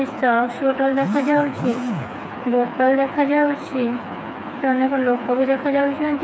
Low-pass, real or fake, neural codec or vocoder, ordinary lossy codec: none; fake; codec, 16 kHz, 4 kbps, FreqCodec, smaller model; none